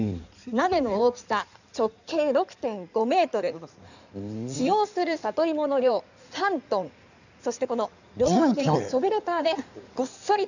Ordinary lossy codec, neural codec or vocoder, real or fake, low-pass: none; codec, 16 kHz in and 24 kHz out, 2.2 kbps, FireRedTTS-2 codec; fake; 7.2 kHz